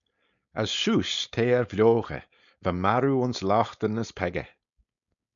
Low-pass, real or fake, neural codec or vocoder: 7.2 kHz; fake; codec, 16 kHz, 4.8 kbps, FACodec